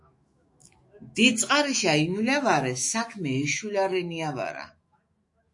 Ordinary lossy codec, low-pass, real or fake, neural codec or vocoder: MP3, 48 kbps; 10.8 kHz; fake; autoencoder, 48 kHz, 128 numbers a frame, DAC-VAE, trained on Japanese speech